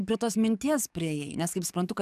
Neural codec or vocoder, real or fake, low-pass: codec, 44.1 kHz, 7.8 kbps, DAC; fake; 14.4 kHz